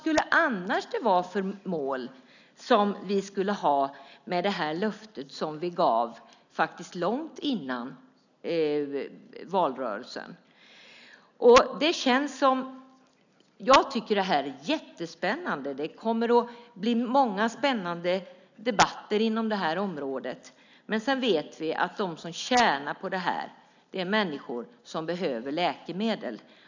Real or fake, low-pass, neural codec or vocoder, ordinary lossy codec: real; 7.2 kHz; none; none